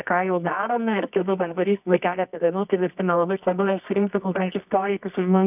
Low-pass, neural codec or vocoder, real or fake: 3.6 kHz; codec, 24 kHz, 0.9 kbps, WavTokenizer, medium music audio release; fake